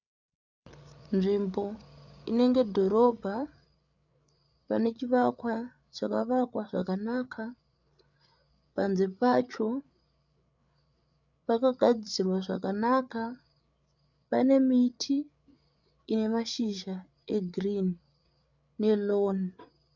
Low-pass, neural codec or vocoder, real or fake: 7.2 kHz; codec, 16 kHz, 8 kbps, FreqCodec, larger model; fake